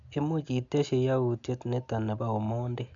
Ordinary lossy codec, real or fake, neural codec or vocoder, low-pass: none; real; none; 7.2 kHz